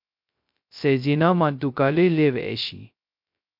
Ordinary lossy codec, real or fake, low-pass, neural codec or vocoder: MP3, 48 kbps; fake; 5.4 kHz; codec, 16 kHz, 0.2 kbps, FocalCodec